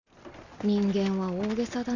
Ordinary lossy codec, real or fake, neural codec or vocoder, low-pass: none; real; none; 7.2 kHz